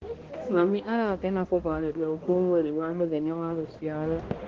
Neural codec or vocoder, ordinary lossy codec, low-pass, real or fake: codec, 16 kHz, 1 kbps, X-Codec, HuBERT features, trained on balanced general audio; Opus, 16 kbps; 7.2 kHz; fake